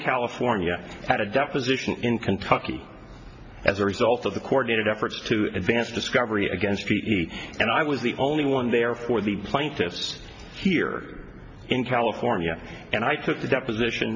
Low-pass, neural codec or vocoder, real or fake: 7.2 kHz; none; real